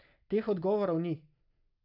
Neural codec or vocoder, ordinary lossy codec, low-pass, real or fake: none; none; 5.4 kHz; real